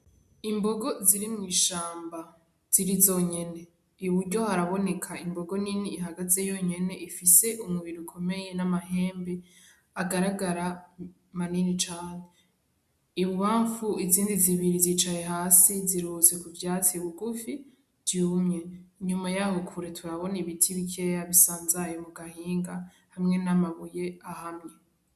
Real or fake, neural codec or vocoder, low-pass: real; none; 14.4 kHz